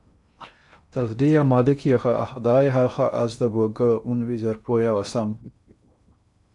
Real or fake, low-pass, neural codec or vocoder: fake; 10.8 kHz; codec, 16 kHz in and 24 kHz out, 0.6 kbps, FocalCodec, streaming, 2048 codes